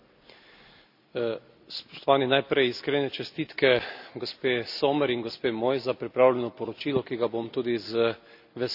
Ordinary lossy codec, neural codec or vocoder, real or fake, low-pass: none; none; real; 5.4 kHz